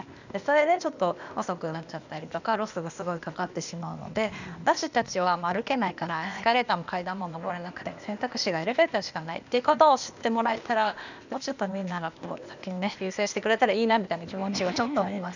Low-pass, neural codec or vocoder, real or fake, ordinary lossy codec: 7.2 kHz; codec, 16 kHz, 0.8 kbps, ZipCodec; fake; none